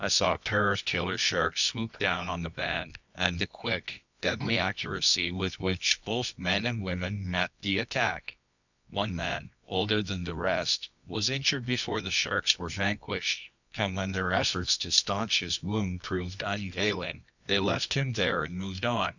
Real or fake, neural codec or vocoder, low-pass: fake; codec, 24 kHz, 0.9 kbps, WavTokenizer, medium music audio release; 7.2 kHz